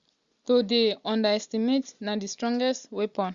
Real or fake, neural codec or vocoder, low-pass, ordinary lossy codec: real; none; 7.2 kHz; Opus, 64 kbps